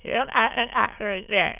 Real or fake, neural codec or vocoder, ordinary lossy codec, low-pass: fake; autoencoder, 22.05 kHz, a latent of 192 numbers a frame, VITS, trained on many speakers; none; 3.6 kHz